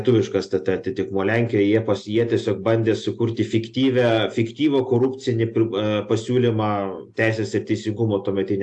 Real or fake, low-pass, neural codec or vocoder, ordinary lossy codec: real; 10.8 kHz; none; AAC, 64 kbps